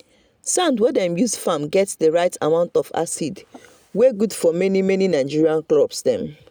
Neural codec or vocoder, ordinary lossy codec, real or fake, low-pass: none; none; real; none